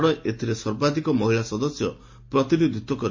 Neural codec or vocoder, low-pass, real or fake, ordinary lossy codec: none; 7.2 kHz; real; MP3, 32 kbps